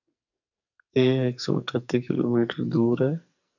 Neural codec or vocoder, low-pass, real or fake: codec, 44.1 kHz, 2.6 kbps, SNAC; 7.2 kHz; fake